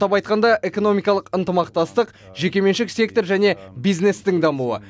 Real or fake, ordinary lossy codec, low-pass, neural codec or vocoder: real; none; none; none